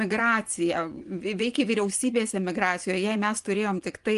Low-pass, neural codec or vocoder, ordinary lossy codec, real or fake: 10.8 kHz; vocoder, 24 kHz, 100 mel bands, Vocos; Opus, 32 kbps; fake